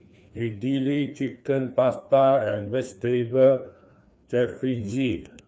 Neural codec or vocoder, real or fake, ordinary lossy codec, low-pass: codec, 16 kHz, 2 kbps, FreqCodec, larger model; fake; none; none